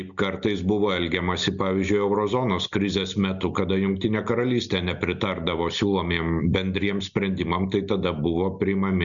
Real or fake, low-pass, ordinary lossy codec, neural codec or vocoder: real; 7.2 kHz; Opus, 64 kbps; none